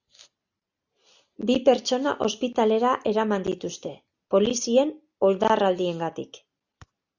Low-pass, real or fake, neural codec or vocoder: 7.2 kHz; real; none